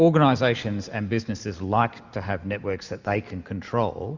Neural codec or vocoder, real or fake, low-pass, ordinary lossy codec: none; real; 7.2 kHz; Opus, 64 kbps